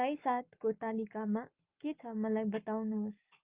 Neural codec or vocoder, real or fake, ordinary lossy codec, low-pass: codec, 44.1 kHz, 7.8 kbps, Pupu-Codec; fake; Opus, 24 kbps; 3.6 kHz